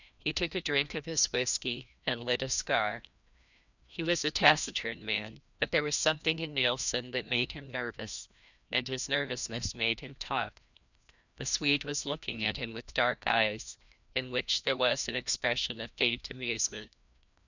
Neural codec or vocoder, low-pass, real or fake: codec, 16 kHz, 1 kbps, FreqCodec, larger model; 7.2 kHz; fake